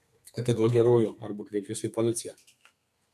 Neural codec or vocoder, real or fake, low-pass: codec, 32 kHz, 1.9 kbps, SNAC; fake; 14.4 kHz